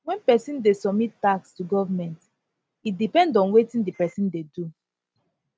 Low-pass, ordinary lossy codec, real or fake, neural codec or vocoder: none; none; real; none